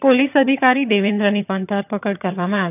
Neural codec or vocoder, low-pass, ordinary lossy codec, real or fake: vocoder, 22.05 kHz, 80 mel bands, HiFi-GAN; 3.6 kHz; none; fake